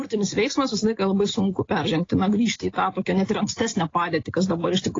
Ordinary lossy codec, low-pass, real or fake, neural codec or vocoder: AAC, 32 kbps; 7.2 kHz; real; none